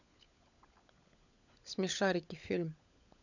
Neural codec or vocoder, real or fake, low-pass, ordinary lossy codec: codec, 16 kHz, 16 kbps, FunCodec, trained on LibriTTS, 50 frames a second; fake; 7.2 kHz; none